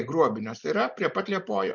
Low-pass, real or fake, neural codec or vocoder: 7.2 kHz; real; none